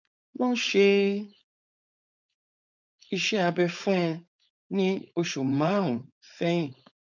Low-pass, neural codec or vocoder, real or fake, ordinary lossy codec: 7.2 kHz; codec, 16 kHz, 4.8 kbps, FACodec; fake; none